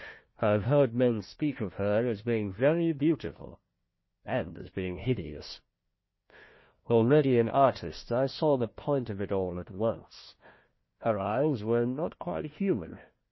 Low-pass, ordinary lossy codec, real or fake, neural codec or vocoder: 7.2 kHz; MP3, 24 kbps; fake; codec, 16 kHz, 1 kbps, FunCodec, trained on Chinese and English, 50 frames a second